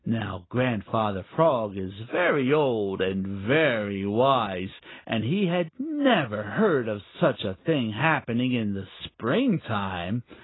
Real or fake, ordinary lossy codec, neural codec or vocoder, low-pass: real; AAC, 16 kbps; none; 7.2 kHz